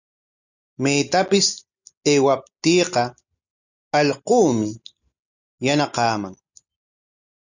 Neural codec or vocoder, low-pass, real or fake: none; 7.2 kHz; real